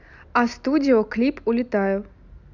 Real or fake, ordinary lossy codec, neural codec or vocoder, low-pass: real; none; none; 7.2 kHz